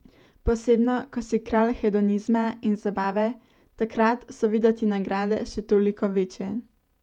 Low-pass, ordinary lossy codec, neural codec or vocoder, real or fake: 19.8 kHz; none; vocoder, 48 kHz, 128 mel bands, Vocos; fake